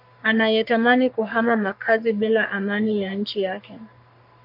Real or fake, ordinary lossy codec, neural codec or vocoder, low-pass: fake; MP3, 48 kbps; codec, 44.1 kHz, 3.4 kbps, Pupu-Codec; 5.4 kHz